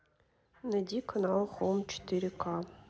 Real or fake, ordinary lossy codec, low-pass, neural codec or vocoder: real; none; none; none